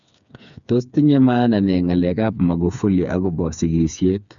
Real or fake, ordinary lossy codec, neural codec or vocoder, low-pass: fake; none; codec, 16 kHz, 4 kbps, FreqCodec, smaller model; 7.2 kHz